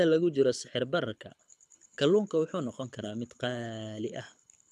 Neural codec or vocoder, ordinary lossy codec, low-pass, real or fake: codec, 24 kHz, 6 kbps, HILCodec; none; none; fake